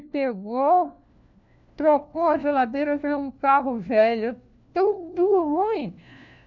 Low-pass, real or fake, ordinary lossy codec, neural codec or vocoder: 7.2 kHz; fake; none; codec, 16 kHz, 1 kbps, FunCodec, trained on LibriTTS, 50 frames a second